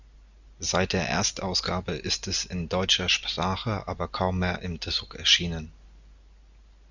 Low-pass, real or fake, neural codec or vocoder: 7.2 kHz; real; none